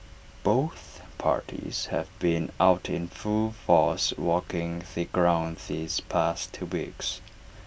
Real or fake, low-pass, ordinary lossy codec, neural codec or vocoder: real; none; none; none